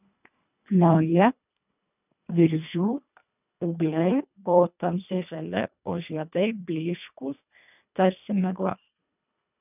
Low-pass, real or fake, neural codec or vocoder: 3.6 kHz; fake; codec, 24 kHz, 1.5 kbps, HILCodec